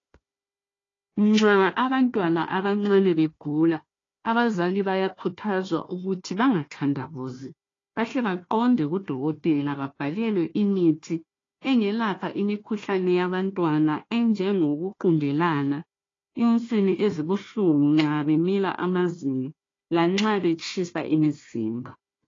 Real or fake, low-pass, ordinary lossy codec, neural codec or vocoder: fake; 7.2 kHz; AAC, 32 kbps; codec, 16 kHz, 1 kbps, FunCodec, trained on Chinese and English, 50 frames a second